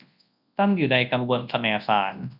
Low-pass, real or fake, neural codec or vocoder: 5.4 kHz; fake; codec, 24 kHz, 0.9 kbps, WavTokenizer, large speech release